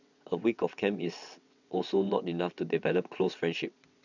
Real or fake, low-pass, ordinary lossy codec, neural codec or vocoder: fake; 7.2 kHz; none; vocoder, 22.05 kHz, 80 mel bands, WaveNeXt